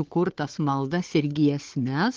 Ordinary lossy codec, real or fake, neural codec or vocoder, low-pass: Opus, 16 kbps; fake; codec, 16 kHz, 4 kbps, FunCodec, trained on Chinese and English, 50 frames a second; 7.2 kHz